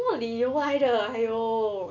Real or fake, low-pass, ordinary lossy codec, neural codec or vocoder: real; 7.2 kHz; none; none